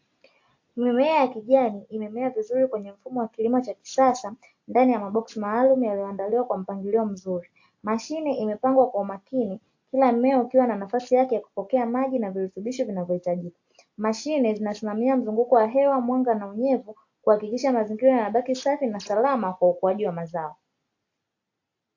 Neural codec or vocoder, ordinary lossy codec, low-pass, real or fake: none; AAC, 48 kbps; 7.2 kHz; real